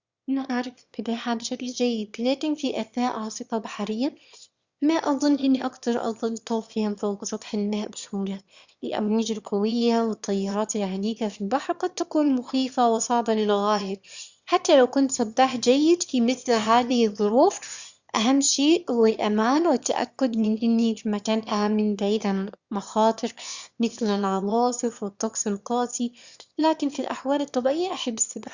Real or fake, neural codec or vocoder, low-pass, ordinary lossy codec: fake; autoencoder, 22.05 kHz, a latent of 192 numbers a frame, VITS, trained on one speaker; 7.2 kHz; Opus, 64 kbps